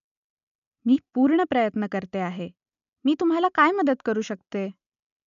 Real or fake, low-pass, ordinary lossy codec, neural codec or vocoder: real; 7.2 kHz; none; none